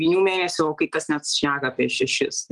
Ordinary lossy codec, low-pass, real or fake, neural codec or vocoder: Opus, 24 kbps; 10.8 kHz; real; none